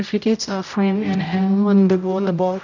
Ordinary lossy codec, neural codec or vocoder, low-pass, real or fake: none; codec, 16 kHz, 0.5 kbps, X-Codec, HuBERT features, trained on general audio; 7.2 kHz; fake